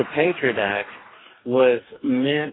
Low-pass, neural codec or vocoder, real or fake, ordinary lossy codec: 7.2 kHz; codec, 44.1 kHz, 2.6 kbps, DAC; fake; AAC, 16 kbps